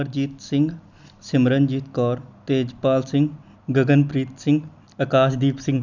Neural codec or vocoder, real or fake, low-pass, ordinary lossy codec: none; real; 7.2 kHz; none